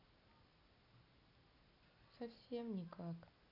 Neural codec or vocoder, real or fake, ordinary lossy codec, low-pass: none; real; none; 5.4 kHz